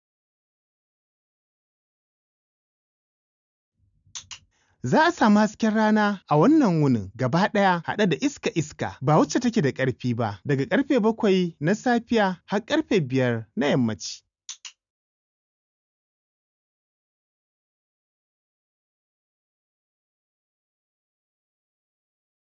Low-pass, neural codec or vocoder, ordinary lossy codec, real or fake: 7.2 kHz; none; none; real